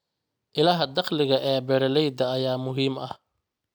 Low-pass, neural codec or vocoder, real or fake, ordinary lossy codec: none; none; real; none